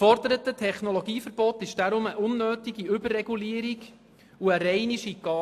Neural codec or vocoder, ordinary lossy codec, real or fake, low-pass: none; AAC, 64 kbps; real; 14.4 kHz